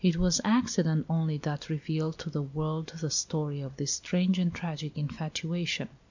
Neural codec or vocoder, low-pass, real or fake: none; 7.2 kHz; real